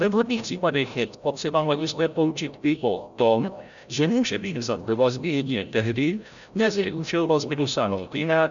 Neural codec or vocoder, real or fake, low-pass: codec, 16 kHz, 0.5 kbps, FreqCodec, larger model; fake; 7.2 kHz